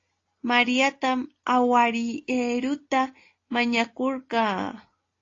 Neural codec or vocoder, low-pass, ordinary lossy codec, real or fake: none; 7.2 kHz; AAC, 32 kbps; real